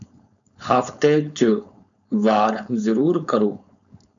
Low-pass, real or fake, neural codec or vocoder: 7.2 kHz; fake; codec, 16 kHz, 4.8 kbps, FACodec